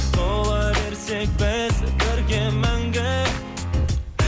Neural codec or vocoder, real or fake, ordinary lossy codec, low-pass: none; real; none; none